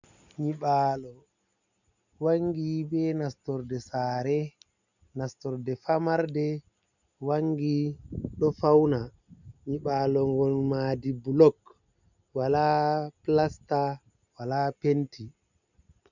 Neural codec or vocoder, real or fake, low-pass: none; real; 7.2 kHz